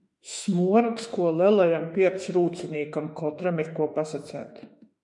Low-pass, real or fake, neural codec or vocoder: 10.8 kHz; fake; autoencoder, 48 kHz, 32 numbers a frame, DAC-VAE, trained on Japanese speech